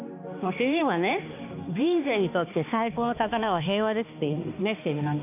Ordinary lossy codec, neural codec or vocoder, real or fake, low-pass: none; codec, 16 kHz, 2 kbps, X-Codec, HuBERT features, trained on balanced general audio; fake; 3.6 kHz